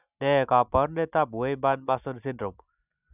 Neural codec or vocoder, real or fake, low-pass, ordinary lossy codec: none; real; 3.6 kHz; none